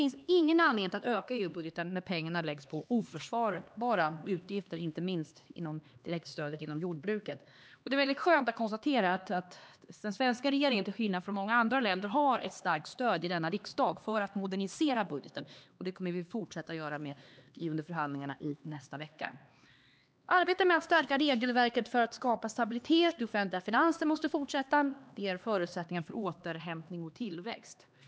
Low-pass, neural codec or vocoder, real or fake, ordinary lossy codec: none; codec, 16 kHz, 2 kbps, X-Codec, HuBERT features, trained on LibriSpeech; fake; none